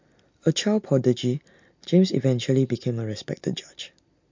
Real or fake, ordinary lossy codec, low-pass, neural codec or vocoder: real; MP3, 48 kbps; 7.2 kHz; none